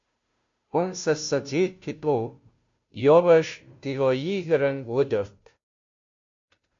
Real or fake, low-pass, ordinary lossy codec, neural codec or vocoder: fake; 7.2 kHz; MP3, 48 kbps; codec, 16 kHz, 0.5 kbps, FunCodec, trained on Chinese and English, 25 frames a second